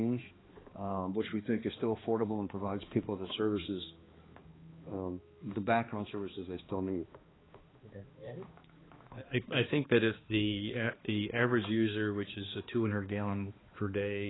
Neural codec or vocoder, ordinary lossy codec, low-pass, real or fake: codec, 16 kHz, 2 kbps, X-Codec, HuBERT features, trained on balanced general audio; AAC, 16 kbps; 7.2 kHz; fake